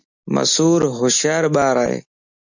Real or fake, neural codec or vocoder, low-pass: real; none; 7.2 kHz